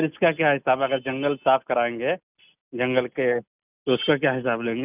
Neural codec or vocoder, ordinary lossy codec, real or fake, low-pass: none; none; real; 3.6 kHz